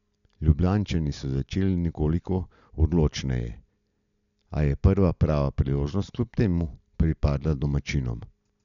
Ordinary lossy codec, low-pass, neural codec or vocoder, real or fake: none; 7.2 kHz; none; real